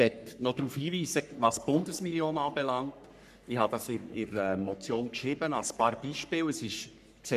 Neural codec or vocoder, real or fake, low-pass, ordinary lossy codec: codec, 44.1 kHz, 3.4 kbps, Pupu-Codec; fake; 14.4 kHz; none